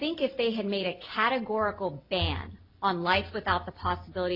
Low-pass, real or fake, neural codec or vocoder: 5.4 kHz; real; none